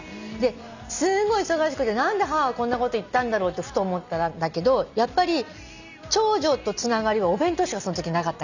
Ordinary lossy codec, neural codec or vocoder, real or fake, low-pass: none; none; real; 7.2 kHz